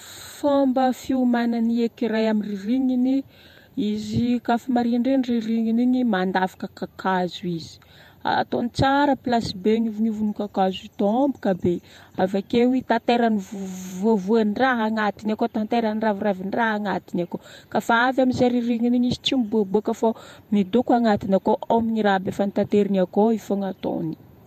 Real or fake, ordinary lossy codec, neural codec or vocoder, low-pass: fake; MP3, 64 kbps; vocoder, 48 kHz, 128 mel bands, Vocos; 14.4 kHz